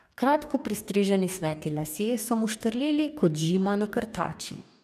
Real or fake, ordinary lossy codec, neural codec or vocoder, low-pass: fake; none; codec, 44.1 kHz, 2.6 kbps, DAC; 14.4 kHz